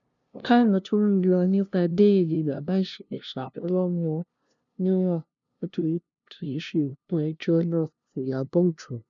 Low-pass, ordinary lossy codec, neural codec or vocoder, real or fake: 7.2 kHz; none; codec, 16 kHz, 0.5 kbps, FunCodec, trained on LibriTTS, 25 frames a second; fake